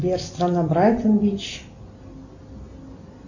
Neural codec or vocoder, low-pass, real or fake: none; 7.2 kHz; real